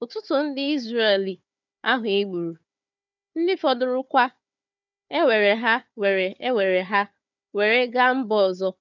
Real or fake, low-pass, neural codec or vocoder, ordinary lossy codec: fake; 7.2 kHz; codec, 16 kHz, 4 kbps, FunCodec, trained on Chinese and English, 50 frames a second; none